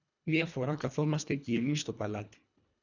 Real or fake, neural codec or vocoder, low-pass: fake; codec, 24 kHz, 1.5 kbps, HILCodec; 7.2 kHz